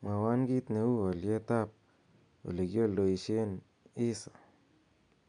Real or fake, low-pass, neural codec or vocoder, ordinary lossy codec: real; 9.9 kHz; none; none